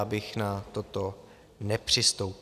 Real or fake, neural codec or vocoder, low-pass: real; none; 14.4 kHz